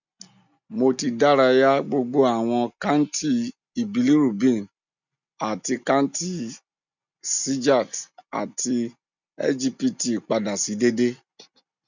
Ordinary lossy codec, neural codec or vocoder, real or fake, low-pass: none; none; real; 7.2 kHz